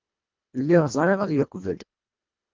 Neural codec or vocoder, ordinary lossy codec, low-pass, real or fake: codec, 24 kHz, 1.5 kbps, HILCodec; Opus, 24 kbps; 7.2 kHz; fake